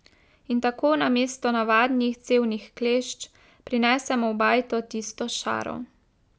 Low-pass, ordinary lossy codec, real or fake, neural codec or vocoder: none; none; real; none